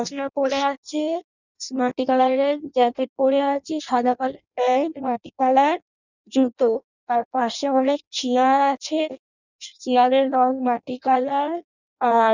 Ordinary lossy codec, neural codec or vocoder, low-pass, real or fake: none; codec, 16 kHz in and 24 kHz out, 0.6 kbps, FireRedTTS-2 codec; 7.2 kHz; fake